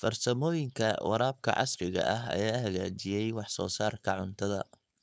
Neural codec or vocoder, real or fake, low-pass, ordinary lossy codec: codec, 16 kHz, 4.8 kbps, FACodec; fake; none; none